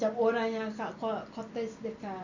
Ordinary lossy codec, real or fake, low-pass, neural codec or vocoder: none; real; 7.2 kHz; none